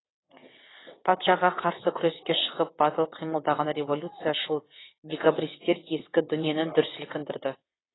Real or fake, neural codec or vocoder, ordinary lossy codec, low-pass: fake; vocoder, 22.05 kHz, 80 mel bands, WaveNeXt; AAC, 16 kbps; 7.2 kHz